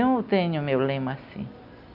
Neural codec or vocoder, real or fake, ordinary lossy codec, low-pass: none; real; none; 5.4 kHz